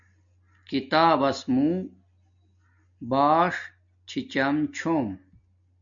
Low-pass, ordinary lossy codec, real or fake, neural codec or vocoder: 7.2 kHz; MP3, 64 kbps; real; none